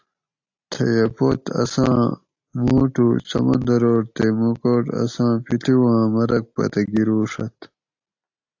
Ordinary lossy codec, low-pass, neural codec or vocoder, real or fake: AAC, 48 kbps; 7.2 kHz; none; real